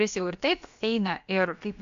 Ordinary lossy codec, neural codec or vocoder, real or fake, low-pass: MP3, 64 kbps; codec, 16 kHz, about 1 kbps, DyCAST, with the encoder's durations; fake; 7.2 kHz